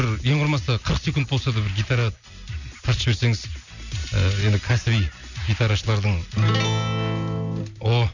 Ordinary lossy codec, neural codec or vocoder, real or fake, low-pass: none; none; real; 7.2 kHz